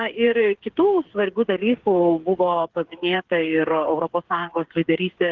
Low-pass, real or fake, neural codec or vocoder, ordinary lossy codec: 7.2 kHz; fake; codec, 16 kHz, 8 kbps, FreqCodec, smaller model; Opus, 16 kbps